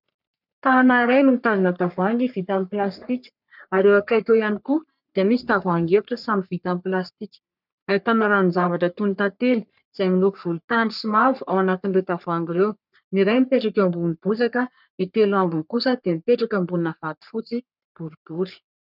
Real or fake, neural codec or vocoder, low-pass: fake; codec, 44.1 kHz, 3.4 kbps, Pupu-Codec; 5.4 kHz